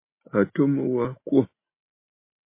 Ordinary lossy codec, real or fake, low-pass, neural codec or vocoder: MP3, 24 kbps; real; 3.6 kHz; none